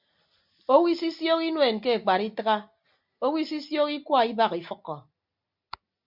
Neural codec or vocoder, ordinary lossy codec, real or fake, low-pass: none; MP3, 48 kbps; real; 5.4 kHz